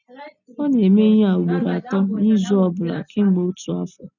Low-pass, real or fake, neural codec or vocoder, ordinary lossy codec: 7.2 kHz; real; none; none